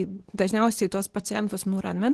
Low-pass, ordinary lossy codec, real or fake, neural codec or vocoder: 10.8 kHz; Opus, 16 kbps; fake; codec, 24 kHz, 0.9 kbps, WavTokenizer, medium speech release version 2